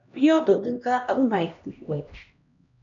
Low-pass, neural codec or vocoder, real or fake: 7.2 kHz; codec, 16 kHz, 1 kbps, X-Codec, HuBERT features, trained on LibriSpeech; fake